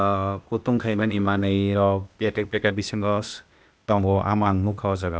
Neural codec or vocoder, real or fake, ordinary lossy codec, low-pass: codec, 16 kHz, 0.8 kbps, ZipCodec; fake; none; none